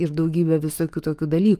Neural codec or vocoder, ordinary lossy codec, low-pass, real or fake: autoencoder, 48 kHz, 128 numbers a frame, DAC-VAE, trained on Japanese speech; Opus, 32 kbps; 14.4 kHz; fake